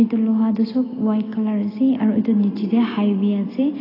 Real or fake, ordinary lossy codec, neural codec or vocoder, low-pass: real; AAC, 24 kbps; none; 5.4 kHz